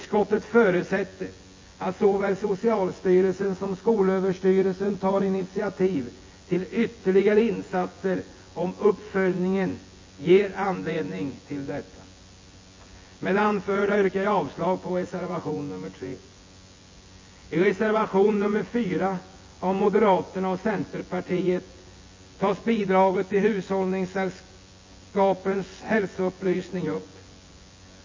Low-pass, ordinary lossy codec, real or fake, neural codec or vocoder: 7.2 kHz; MP3, 32 kbps; fake; vocoder, 24 kHz, 100 mel bands, Vocos